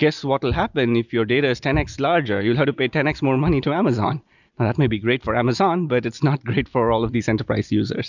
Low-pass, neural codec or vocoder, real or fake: 7.2 kHz; none; real